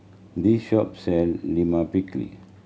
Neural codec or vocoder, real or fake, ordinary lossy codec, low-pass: none; real; none; none